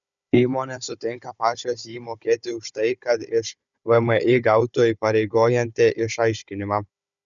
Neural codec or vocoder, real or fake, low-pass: codec, 16 kHz, 16 kbps, FunCodec, trained on Chinese and English, 50 frames a second; fake; 7.2 kHz